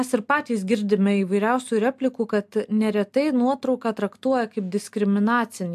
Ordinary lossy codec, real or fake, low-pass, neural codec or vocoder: AAC, 96 kbps; real; 14.4 kHz; none